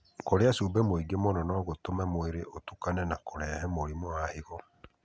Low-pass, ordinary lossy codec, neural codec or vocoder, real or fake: none; none; none; real